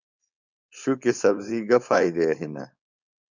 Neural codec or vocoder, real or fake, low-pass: codec, 16 kHz, 4.8 kbps, FACodec; fake; 7.2 kHz